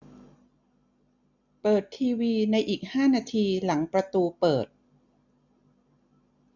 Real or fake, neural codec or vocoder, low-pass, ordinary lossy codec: real; none; 7.2 kHz; none